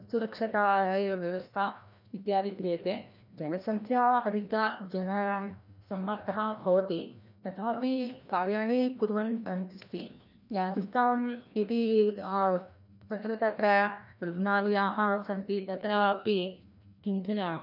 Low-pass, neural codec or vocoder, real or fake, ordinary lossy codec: 5.4 kHz; codec, 16 kHz, 1 kbps, FreqCodec, larger model; fake; none